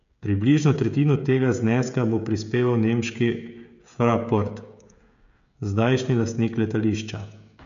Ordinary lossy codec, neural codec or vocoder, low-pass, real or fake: MP3, 64 kbps; codec, 16 kHz, 16 kbps, FreqCodec, smaller model; 7.2 kHz; fake